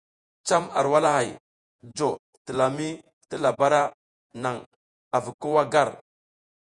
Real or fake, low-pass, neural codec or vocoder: fake; 10.8 kHz; vocoder, 48 kHz, 128 mel bands, Vocos